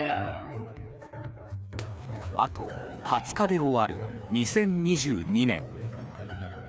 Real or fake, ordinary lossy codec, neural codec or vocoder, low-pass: fake; none; codec, 16 kHz, 2 kbps, FreqCodec, larger model; none